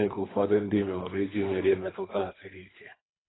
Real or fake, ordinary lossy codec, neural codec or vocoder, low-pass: fake; AAC, 16 kbps; codec, 24 kHz, 3 kbps, HILCodec; 7.2 kHz